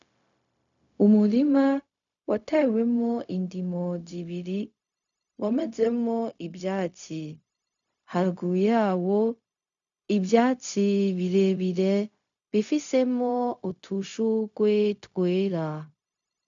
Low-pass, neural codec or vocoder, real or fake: 7.2 kHz; codec, 16 kHz, 0.4 kbps, LongCat-Audio-Codec; fake